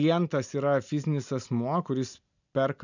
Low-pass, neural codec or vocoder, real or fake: 7.2 kHz; none; real